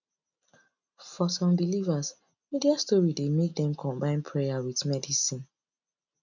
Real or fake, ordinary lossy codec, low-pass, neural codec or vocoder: real; none; 7.2 kHz; none